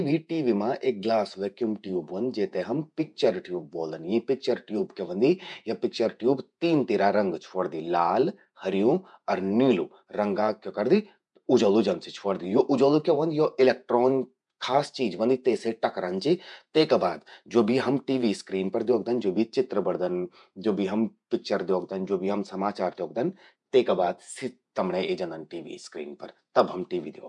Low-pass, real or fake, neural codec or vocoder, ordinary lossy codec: 10.8 kHz; fake; vocoder, 48 kHz, 128 mel bands, Vocos; none